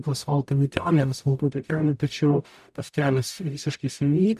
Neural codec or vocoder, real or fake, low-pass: codec, 44.1 kHz, 0.9 kbps, DAC; fake; 14.4 kHz